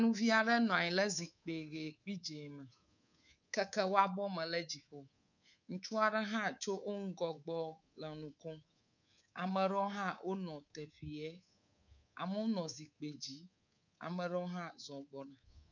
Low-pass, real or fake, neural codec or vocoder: 7.2 kHz; fake; codec, 24 kHz, 3.1 kbps, DualCodec